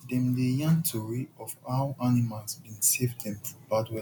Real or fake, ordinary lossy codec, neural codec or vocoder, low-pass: real; none; none; 19.8 kHz